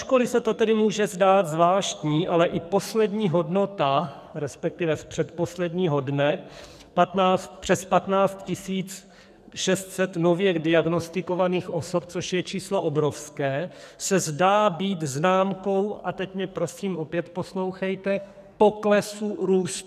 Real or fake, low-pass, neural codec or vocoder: fake; 14.4 kHz; codec, 44.1 kHz, 2.6 kbps, SNAC